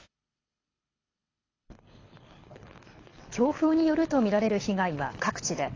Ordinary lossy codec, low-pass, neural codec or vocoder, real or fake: AAC, 32 kbps; 7.2 kHz; codec, 24 kHz, 6 kbps, HILCodec; fake